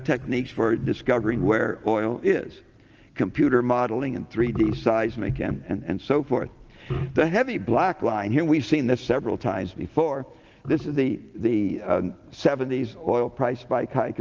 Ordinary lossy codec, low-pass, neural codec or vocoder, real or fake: Opus, 24 kbps; 7.2 kHz; none; real